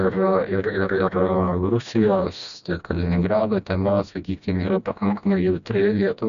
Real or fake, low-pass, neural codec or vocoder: fake; 7.2 kHz; codec, 16 kHz, 1 kbps, FreqCodec, smaller model